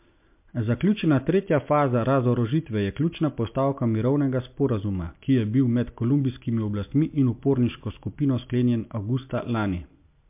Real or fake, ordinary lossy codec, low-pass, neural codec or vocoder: real; MP3, 32 kbps; 3.6 kHz; none